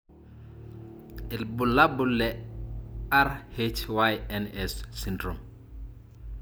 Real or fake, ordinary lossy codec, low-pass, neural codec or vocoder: real; none; none; none